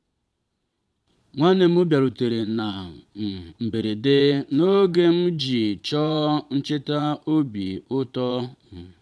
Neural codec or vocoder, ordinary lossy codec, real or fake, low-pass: vocoder, 22.05 kHz, 80 mel bands, Vocos; none; fake; none